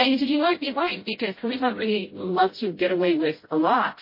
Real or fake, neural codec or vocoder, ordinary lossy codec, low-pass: fake; codec, 16 kHz, 0.5 kbps, FreqCodec, smaller model; MP3, 24 kbps; 5.4 kHz